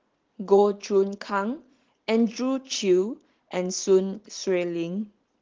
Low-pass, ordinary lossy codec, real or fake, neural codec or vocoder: 7.2 kHz; Opus, 16 kbps; fake; vocoder, 44.1 kHz, 80 mel bands, Vocos